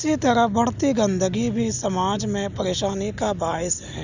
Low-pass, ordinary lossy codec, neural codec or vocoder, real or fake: 7.2 kHz; none; none; real